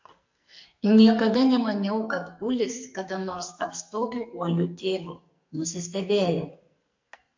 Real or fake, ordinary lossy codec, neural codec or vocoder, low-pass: fake; MP3, 48 kbps; codec, 44.1 kHz, 2.6 kbps, SNAC; 7.2 kHz